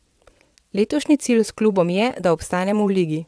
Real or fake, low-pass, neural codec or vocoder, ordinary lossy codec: fake; none; vocoder, 22.05 kHz, 80 mel bands, WaveNeXt; none